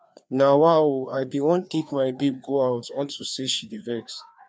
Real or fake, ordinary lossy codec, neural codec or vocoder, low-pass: fake; none; codec, 16 kHz, 2 kbps, FreqCodec, larger model; none